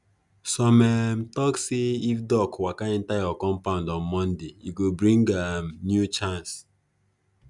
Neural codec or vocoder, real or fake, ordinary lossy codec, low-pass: none; real; none; 10.8 kHz